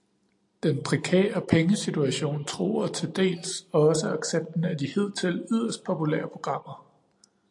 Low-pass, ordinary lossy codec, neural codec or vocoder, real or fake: 10.8 kHz; AAC, 64 kbps; none; real